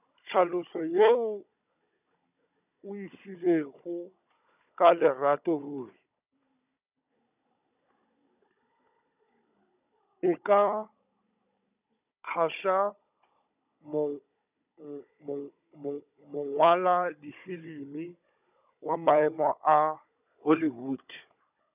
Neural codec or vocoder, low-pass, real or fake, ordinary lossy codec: codec, 16 kHz, 16 kbps, FunCodec, trained on Chinese and English, 50 frames a second; 3.6 kHz; fake; none